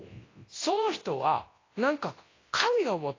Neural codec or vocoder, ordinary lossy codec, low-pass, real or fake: codec, 24 kHz, 0.9 kbps, WavTokenizer, large speech release; AAC, 32 kbps; 7.2 kHz; fake